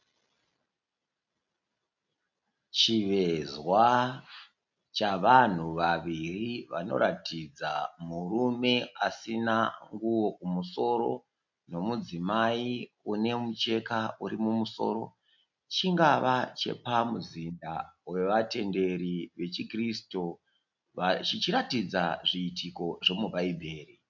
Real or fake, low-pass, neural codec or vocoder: real; 7.2 kHz; none